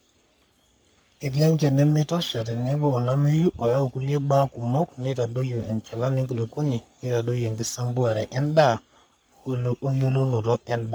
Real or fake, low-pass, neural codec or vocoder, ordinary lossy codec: fake; none; codec, 44.1 kHz, 3.4 kbps, Pupu-Codec; none